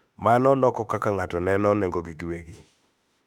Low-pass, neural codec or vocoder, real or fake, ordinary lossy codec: 19.8 kHz; autoencoder, 48 kHz, 32 numbers a frame, DAC-VAE, trained on Japanese speech; fake; none